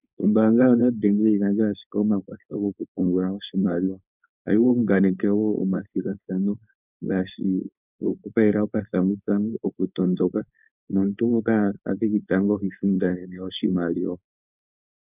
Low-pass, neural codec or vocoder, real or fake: 3.6 kHz; codec, 16 kHz, 4.8 kbps, FACodec; fake